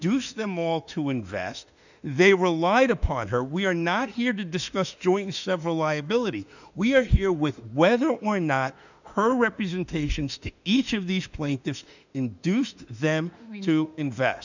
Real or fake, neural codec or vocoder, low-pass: fake; autoencoder, 48 kHz, 32 numbers a frame, DAC-VAE, trained on Japanese speech; 7.2 kHz